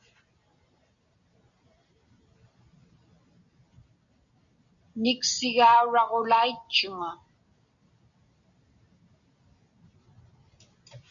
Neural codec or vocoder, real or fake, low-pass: none; real; 7.2 kHz